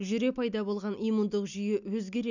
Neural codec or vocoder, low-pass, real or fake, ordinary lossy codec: none; 7.2 kHz; real; none